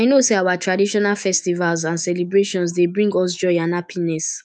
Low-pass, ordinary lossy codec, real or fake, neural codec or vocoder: 9.9 kHz; none; fake; autoencoder, 48 kHz, 128 numbers a frame, DAC-VAE, trained on Japanese speech